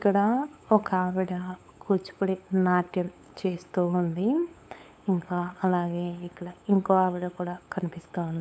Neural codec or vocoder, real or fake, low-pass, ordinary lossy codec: codec, 16 kHz, 8 kbps, FunCodec, trained on LibriTTS, 25 frames a second; fake; none; none